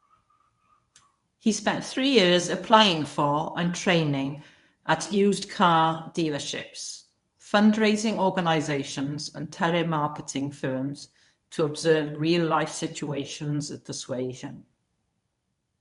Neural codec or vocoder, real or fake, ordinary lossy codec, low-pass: codec, 24 kHz, 0.9 kbps, WavTokenizer, medium speech release version 1; fake; none; 10.8 kHz